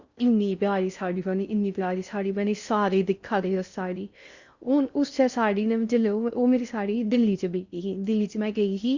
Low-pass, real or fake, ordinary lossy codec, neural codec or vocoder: 7.2 kHz; fake; Opus, 64 kbps; codec, 16 kHz in and 24 kHz out, 0.6 kbps, FocalCodec, streaming, 4096 codes